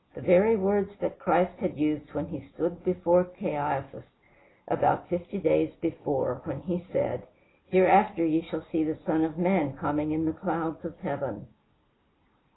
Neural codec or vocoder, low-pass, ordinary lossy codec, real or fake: none; 7.2 kHz; AAC, 16 kbps; real